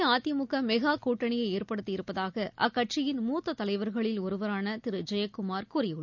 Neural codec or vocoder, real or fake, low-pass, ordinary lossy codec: none; real; 7.2 kHz; none